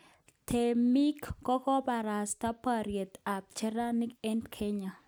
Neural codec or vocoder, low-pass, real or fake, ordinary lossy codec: none; none; real; none